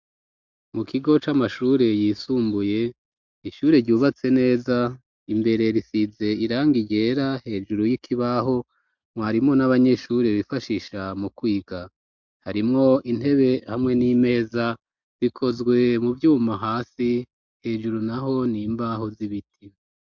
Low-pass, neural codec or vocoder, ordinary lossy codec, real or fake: 7.2 kHz; none; MP3, 64 kbps; real